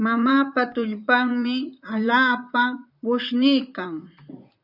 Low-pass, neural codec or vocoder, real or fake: 5.4 kHz; vocoder, 44.1 kHz, 128 mel bands, Pupu-Vocoder; fake